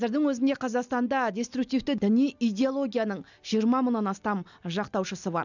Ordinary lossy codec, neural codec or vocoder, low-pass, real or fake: none; none; 7.2 kHz; real